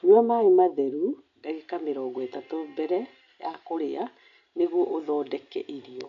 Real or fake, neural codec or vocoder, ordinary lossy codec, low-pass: real; none; AAC, 96 kbps; 7.2 kHz